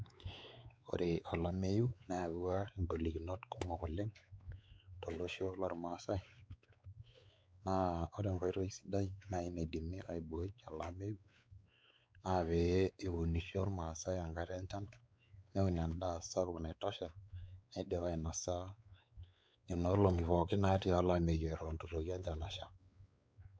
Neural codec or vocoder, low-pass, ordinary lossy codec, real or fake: codec, 16 kHz, 4 kbps, X-Codec, WavLM features, trained on Multilingual LibriSpeech; none; none; fake